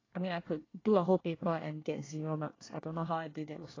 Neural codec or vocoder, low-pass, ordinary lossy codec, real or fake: codec, 24 kHz, 1 kbps, SNAC; 7.2 kHz; AAC, 32 kbps; fake